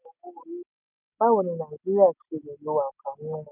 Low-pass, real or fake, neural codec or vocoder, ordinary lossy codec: 3.6 kHz; real; none; none